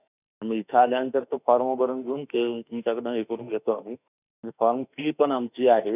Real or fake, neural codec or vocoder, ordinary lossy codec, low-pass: fake; autoencoder, 48 kHz, 32 numbers a frame, DAC-VAE, trained on Japanese speech; none; 3.6 kHz